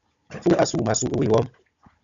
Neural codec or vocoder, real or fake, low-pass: codec, 16 kHz, 16 kbps, FunCodec, trained on Chinese and English, 50 frames a second; fake; 7.2 kHz